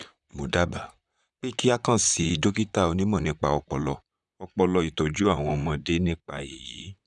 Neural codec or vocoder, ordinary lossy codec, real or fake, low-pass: vocoder, 44.1 kHz, 128 mel bands, Pupu-Vocoder; none; fake; 10.8 kHz